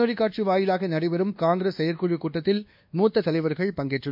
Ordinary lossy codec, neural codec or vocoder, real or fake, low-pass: MP3, 48 kbps; codec, 24 kHz, 1.2 kbps, DualCodec; fake; 5.4 kHz